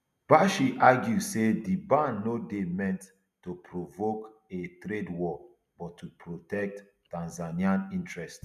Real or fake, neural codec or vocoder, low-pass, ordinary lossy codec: real; none; 14.4 kHz; none